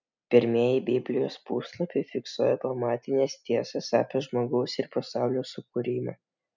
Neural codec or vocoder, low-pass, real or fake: none; 7.2 kHz; real